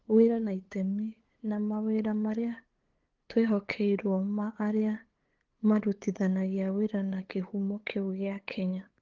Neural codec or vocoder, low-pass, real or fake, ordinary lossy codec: codec, 16 kHz, 8 kbps, FunCodec, trained on LibriTTS, 25 frames a second; 7.2 kHz; fake; Opus, 16 kbps